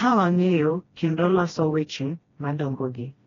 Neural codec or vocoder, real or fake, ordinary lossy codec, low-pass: codec, 16 kHz, 1 kbps, FreqCodec, smaller model; fake; AAC, 32 kbps; 7.2 kHz